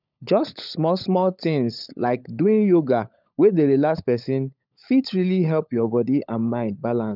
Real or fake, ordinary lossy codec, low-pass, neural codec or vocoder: fake; none; 5.4 kHz; codec, 16 kHz, 16 kbps, FunCodec, trained on LibriTTS, 50 frames a second